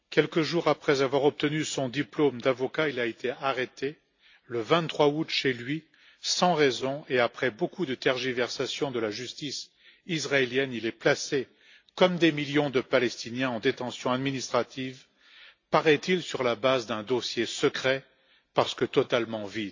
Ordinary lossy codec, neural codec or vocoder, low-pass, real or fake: AAC, 48 kbps; none; 7.2 kHz; real